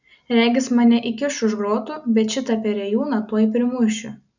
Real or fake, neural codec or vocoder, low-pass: real; none; 7.2 kHz